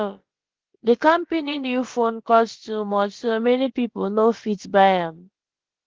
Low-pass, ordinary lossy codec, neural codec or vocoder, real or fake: 7.2 kHz; Opus, 16 kbps; codec, 16 kHz, about 1 kbps, DyCAST, with the encoder's durations; fake